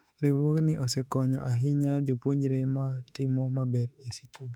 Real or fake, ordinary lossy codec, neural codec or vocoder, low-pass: fake; none; autoencoder, 48 kHz, 32 numbers a frame, DAC-VAE, trained on Japanese speech; 19.8 kHz